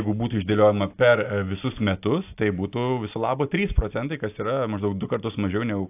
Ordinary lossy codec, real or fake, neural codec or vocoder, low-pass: AAC, 32 kbps; real; none; 3.6 kHz